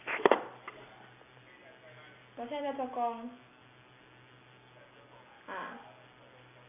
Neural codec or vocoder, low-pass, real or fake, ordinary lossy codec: none; 3.6 kHz; real; none